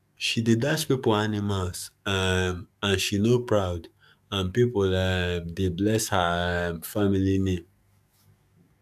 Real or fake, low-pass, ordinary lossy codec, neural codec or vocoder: fake; 14.4 kHz; none; codec, 44.1 kHz, 7.8 kbps, DAC